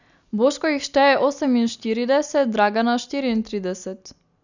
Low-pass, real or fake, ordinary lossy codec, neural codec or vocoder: 7.2 kHz; real; none; none